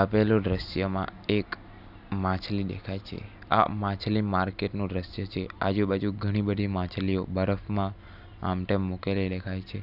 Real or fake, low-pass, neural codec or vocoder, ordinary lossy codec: real; 5.4 kHz; none; none